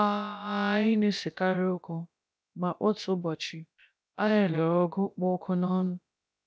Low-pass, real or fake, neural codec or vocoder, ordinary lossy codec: none; fake; codec, 16 kHz, about 1 kbps, DyCAST, with the encoder's durations; none